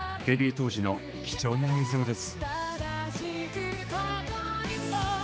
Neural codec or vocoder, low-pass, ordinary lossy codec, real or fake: codec, 16 kHz, 2 kbps, X-Codec, HuBERT features, trained on general audio; none; none; fake